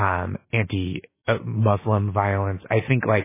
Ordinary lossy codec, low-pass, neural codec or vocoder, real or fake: MP3, 16 kbps; 3.6 kHz; none; real